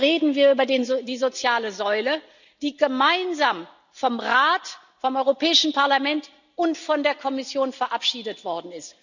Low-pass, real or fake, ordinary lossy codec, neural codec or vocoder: 7.2 kHz; real; none; none